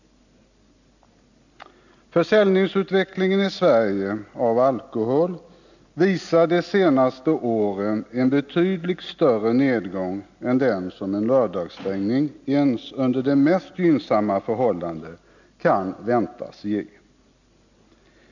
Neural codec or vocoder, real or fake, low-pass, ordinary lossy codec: none; real; 7.2 kHz; none